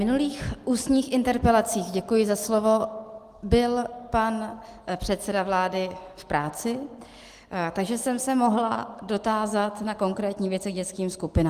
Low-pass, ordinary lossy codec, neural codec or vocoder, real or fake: 14.4 kHz; Opus, 24 kbps; none; real